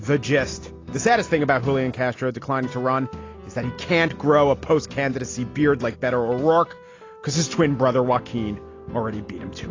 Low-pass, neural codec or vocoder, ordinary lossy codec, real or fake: 7.2 kHz; none; AAC, 32 kbps; real